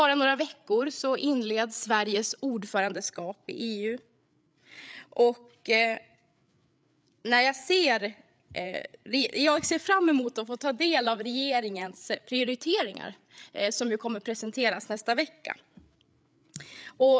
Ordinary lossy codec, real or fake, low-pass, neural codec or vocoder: none; fake; none; codec, 16 kHz, 8 kbps, FreqCodec, larger model